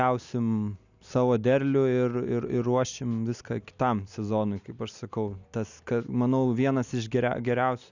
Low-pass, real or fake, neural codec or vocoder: 7.2 kHz; real; none